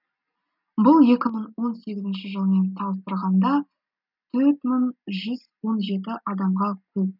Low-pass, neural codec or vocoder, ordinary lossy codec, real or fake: 5.4 kHz; none; none; real